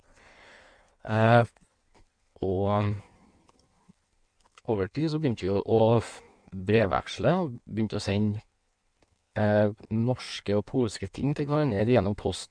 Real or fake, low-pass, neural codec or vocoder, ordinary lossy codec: fake; 9.9 kHz; codec, 16 kHz in and 24 kHz out, 1.1 kbps, FireRedTTS-2 codec; none